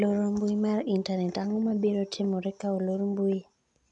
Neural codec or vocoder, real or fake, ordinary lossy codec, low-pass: none; real; none; 10.8 kHz